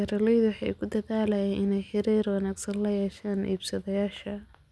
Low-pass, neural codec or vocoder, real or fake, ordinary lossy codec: none; none; real; none